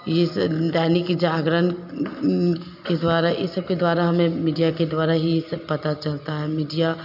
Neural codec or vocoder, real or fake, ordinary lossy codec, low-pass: none; real; none; 5.4 kHz